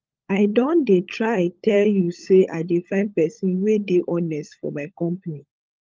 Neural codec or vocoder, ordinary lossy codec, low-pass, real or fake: codec, 16 kHz, 16 kbps, FunCodec, trained on LibriTTS, 50 frames a second; Opus, 24 kbps; 7.2 kHz; fake